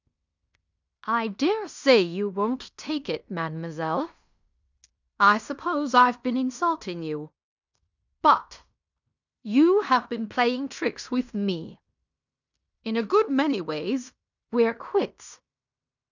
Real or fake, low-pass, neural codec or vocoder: fake; 7.2 kHz; codec, 16 kHz in and 24 kHz out, 0.9 kbps, LongCat-Audio-Codec, fine tuned four codebook decoder